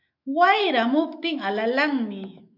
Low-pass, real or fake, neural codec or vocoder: 5.4 kHz; fake; autoencoder, 48 kHz, 128 numbers a frame, DAC-VAE, trained on Japanese speech